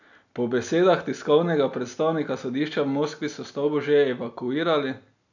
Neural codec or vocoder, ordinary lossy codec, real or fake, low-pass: none; none; real; 7.2 kHz